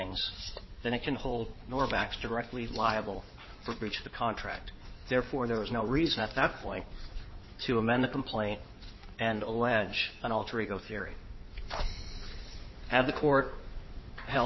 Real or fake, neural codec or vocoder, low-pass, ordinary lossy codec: fake; codec, 16 kHz in and 24 kHz out, 2.2 kbps, FireRedTTS-2 codec; 7.2 kHz; MP3, 24 kbps